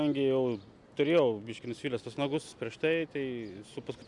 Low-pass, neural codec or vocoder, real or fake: 10.8 kHz; none; real